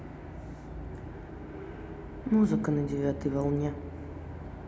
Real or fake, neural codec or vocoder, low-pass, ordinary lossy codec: real; none; none; none